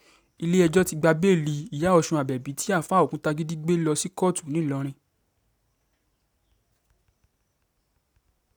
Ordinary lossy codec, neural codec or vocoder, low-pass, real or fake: none; none; none; real